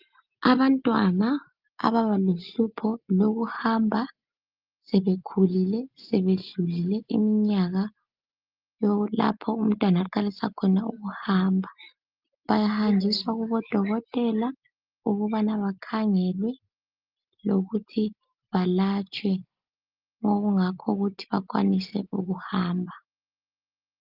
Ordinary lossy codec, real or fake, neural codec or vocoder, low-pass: Opus, 24 kbps; real; none; 5.4 kHz